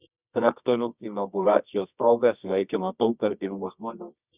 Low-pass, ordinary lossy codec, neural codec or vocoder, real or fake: 3.6 kHz; Opus, 64 kbps; codec, 24 kHz, 0.9 kbps, WavTokenizer, medium music audio release; fake